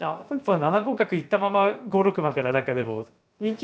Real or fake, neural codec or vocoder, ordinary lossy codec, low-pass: fake; codec, 16 kHz, about 1 kbps, DyCAST, with the encoder's durations; none; none